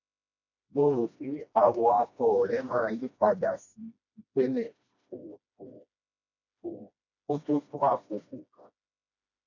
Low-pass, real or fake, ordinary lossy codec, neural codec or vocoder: 7.2 kHz; fake; none; codec, 16 kHz, 1 kbps, FreqCodec, smaller model